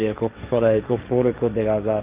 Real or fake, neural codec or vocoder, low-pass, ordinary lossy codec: fake; codec, 16 kHz, 1.1 kbps, Voila-Tokenizer; 3.6 kHz; Opus, 24 kbps